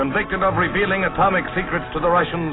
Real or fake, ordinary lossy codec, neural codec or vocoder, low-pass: real; AAC, 16 kbps; none; 7.2 kHz